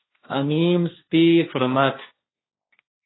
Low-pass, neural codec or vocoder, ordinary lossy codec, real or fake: 7.2 kHz; codec, 16 kHz, 1.1 kbps, Voila-Tokenizer; AAC, 16 kbps; fake